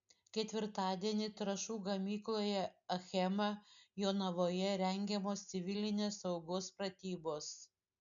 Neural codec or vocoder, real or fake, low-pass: none; real; 7.2 kHz